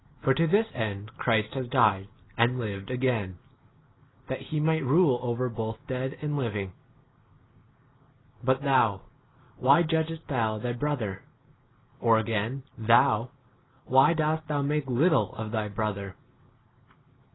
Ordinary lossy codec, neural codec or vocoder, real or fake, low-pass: AAC, 16 kbps; none; real; 7.2 kHz